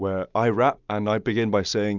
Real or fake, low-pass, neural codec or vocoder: real; 7.2 kHz; none